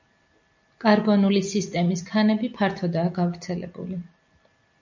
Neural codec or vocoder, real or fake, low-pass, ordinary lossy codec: none; real; 7.2 kHz; MP3, 64 kbps